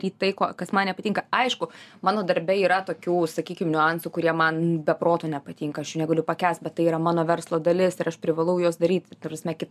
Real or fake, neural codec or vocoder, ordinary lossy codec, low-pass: real; none; MP3, 96 kbps; 14.4 kHz